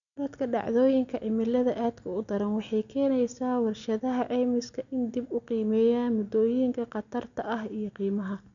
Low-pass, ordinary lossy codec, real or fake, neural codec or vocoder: 7.2 kHz; none; real; none